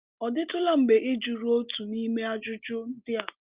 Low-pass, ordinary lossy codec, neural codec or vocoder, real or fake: 3.6 kHz; Opus, 32 kbps; none; real